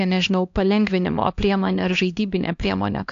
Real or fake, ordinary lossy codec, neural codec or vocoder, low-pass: fake; AAC, 64 kbps; codec, 16 kHz, 1 kbps, X-Codec, HuBERT features, trained on LibriSpeech; 7.2 kHz